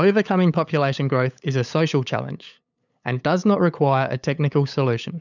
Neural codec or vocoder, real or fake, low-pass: codec, 16 kHz, 8 kbps, FunCodec, trained on LibriTTS, 25 frames a second; fake; 7.2 kHz